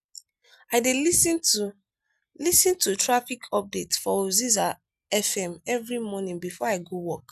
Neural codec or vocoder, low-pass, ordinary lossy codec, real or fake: none; 14.4 kHz; none; real